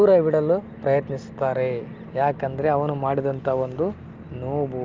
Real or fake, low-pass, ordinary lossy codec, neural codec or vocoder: real; 7.2 kHz; Opus, 32 kbps; none